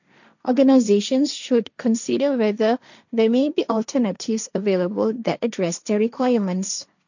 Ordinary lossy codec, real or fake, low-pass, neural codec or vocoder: none; fake; 7.2 kHz; codec, 16 kHz, 1.1 kbps, Voila-Tokenizer